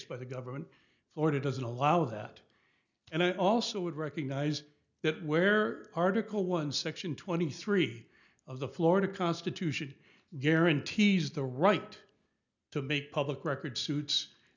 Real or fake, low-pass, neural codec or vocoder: real; 7.2 kHz; none